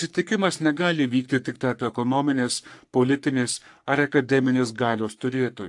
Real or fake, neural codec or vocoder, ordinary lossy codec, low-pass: fake; codec, 44.1 kHz, 3.4 kbps, Pupu-Codec; AAC, 64 kbps; 10.8 kHz